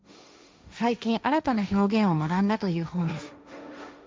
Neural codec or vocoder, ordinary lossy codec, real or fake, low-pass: codec, 16 kHz, 1.1 kbps, Voila-Tokenizer; none; fake; none